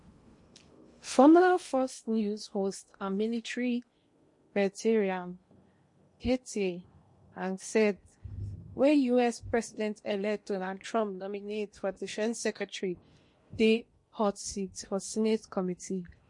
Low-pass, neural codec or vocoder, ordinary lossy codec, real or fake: 10.8 kHz; codec, 16 kHz in and 24 kHz out, 0.8 kbps, FocalCodec, streaming, 65536 codes; MP3, 48 kbps; fake